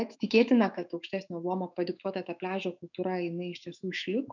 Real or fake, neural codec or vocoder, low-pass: fake; autoencoder, 48 kHz, 128 numbers a frame, DAC-VAE, trained on Japanese speech; 7.2 kHz